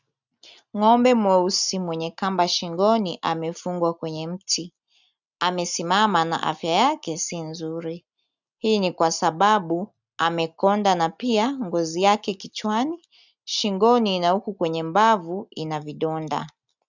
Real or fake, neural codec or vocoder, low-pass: real; none; 7.2 kHz